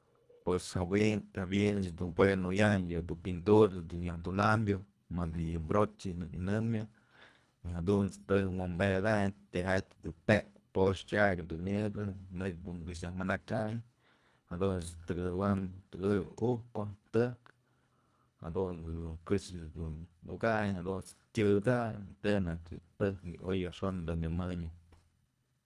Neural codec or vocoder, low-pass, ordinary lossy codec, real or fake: codec, 24 kHz, 1.5 kbps, HILCodec; none; none; fake